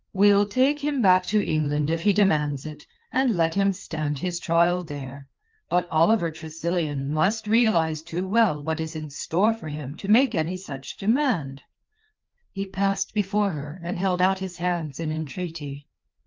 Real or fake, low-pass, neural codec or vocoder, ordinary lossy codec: fake; 7.2 kHz; codec, 16 kHz, 2 kbps, FreqCodec, larger model; Opus, 24 kbps